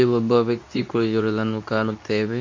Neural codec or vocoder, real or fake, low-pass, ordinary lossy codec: codec, 24 kHz, 0.9 kbps, WavTokenizer, medium speech release version 2; fake; 7.2 kHz; MP3, 48 kbps